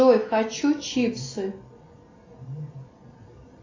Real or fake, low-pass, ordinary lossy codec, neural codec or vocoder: real; 7.2 kHz; AAC, 32 kbps; none